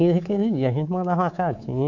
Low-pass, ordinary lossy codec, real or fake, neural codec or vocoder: 7.2 kHz; none; fake; codec, 24 kHz, 3.1 kbps, DualCodec